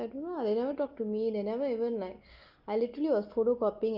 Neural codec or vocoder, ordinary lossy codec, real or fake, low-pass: none; Opus, 24 kbps; real; 5.4 kHz